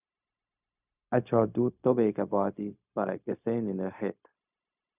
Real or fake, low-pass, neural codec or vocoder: fake; 3.6 kHz; codec, 16 kHz, 0.4 kbps, LongCat-Audio-Codec